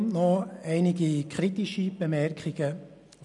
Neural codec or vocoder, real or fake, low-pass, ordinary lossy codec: none; real; 10.8 kHz; none